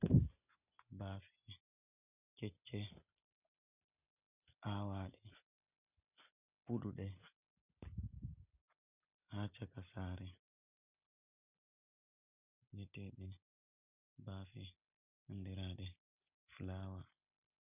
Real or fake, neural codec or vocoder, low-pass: real; none; 3.6 kHz